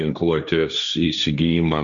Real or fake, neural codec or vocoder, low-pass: fake; codec, 16 kHz, 1.1 kbps, Voila-Tokenizer; 7.2 kHz